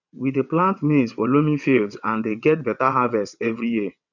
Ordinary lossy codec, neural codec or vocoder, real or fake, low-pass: none; vocoder, 22.05 kHz, 80 mel bands, WaveNeXt; fake; 7.2 kHz